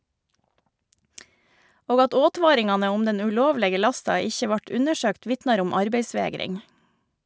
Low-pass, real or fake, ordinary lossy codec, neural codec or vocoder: none; real; none; none